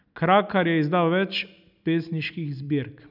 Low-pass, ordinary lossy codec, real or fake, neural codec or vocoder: 5.4 kHz; none; real; none